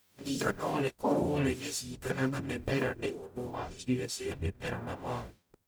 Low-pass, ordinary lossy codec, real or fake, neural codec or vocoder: none; none; fake; codec, 44.1 kHz, 0.9 kbps, DAC